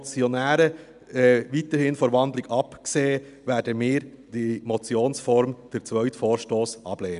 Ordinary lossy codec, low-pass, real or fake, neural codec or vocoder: none; 10.8 kHz; real; none